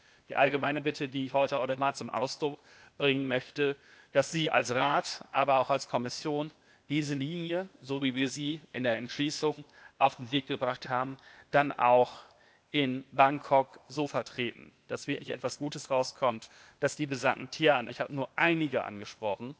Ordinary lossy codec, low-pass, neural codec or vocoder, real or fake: none; none; codec, 16 kHz, 0.8 kbps, ZipCodec; fake